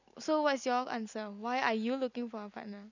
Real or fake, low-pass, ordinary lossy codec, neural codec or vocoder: real; 7.2 kHz; none; none